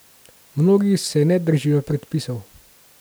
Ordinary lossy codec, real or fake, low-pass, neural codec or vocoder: none; real; none; none